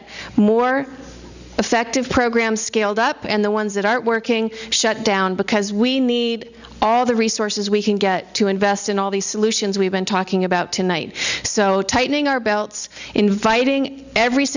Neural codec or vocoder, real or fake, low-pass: none; real; 7.2 kHz